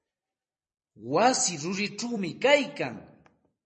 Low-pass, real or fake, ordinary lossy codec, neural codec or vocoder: 10.8 kHz; fake; MP3, 32 kbps; vocoder, 44.1 kHz, 128 mel bands every 256 samples, BigVGAN v2